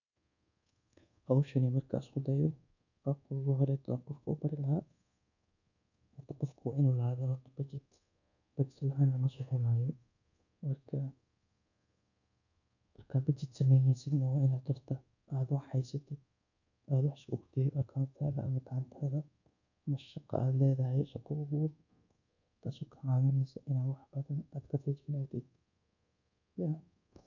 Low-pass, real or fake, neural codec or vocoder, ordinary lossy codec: 7.2 kHz; fake; codec, 24 kHz, 1.2 kbps, DualCodec; none